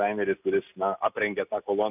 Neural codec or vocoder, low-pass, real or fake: none; 3.6 kHz; real